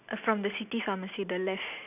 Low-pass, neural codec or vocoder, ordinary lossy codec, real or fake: 3.6 kHz; none; none; real